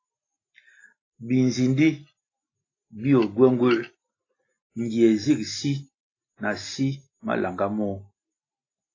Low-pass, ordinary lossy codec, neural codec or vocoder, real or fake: 7.2 kHz; AAC, 32 kbps; none; real